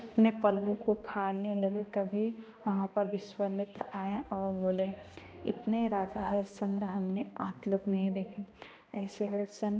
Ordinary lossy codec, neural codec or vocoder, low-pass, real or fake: none; codec, 16 kHz, 1 kbps, X-Codec, HuBERT features, trained on balanced general audio; none; fake